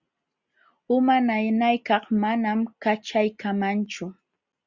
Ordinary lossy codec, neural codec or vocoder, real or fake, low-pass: Opus, 64 kbps; none; real; 7.2 kHz